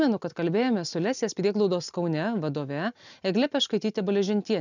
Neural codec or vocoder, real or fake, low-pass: none; real; 7.2 kHz